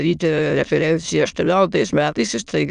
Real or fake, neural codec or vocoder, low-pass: fake; autoencoder, 22.05 kHz, a latent of 192 numbers a frame, VITS, trained on many speakers; 9.9 kHz